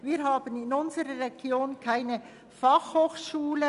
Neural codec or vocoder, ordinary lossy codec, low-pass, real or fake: none; none; 10.8 kHz; real